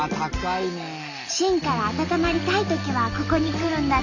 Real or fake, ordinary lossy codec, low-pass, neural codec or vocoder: real; none; 7.2 kHz; none